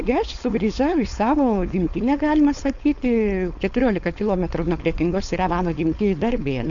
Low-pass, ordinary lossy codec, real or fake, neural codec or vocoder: 7.2 kHz; AAC, 64 kbps; fake; codec, 16 kHz, 4.8 kbps, FACodec